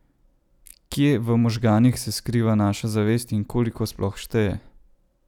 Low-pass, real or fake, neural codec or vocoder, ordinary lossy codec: 19.8 kHz; real; none; none